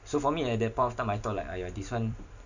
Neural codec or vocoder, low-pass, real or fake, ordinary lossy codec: vocoder, 44.1 kHz, 128 mel bands every 256 samples, BigVGAN v2; 7.2 kHz; fake; none